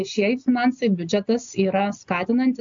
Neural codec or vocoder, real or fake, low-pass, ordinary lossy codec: none; real; 7.2 kHz; AAC, 64 kbps